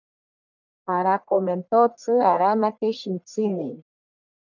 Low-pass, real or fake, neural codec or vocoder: 7.2 kHz; fake; codec, 44.1 kHz, 1.7 kbps, Pupu-Codec